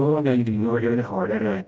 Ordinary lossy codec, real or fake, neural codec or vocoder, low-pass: none; fake; codec, 16 kHz, 0.5 kbps, FreqCodec, smaller model; none